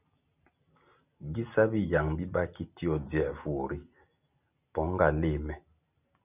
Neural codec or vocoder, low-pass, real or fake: none; 3.6 kHz; real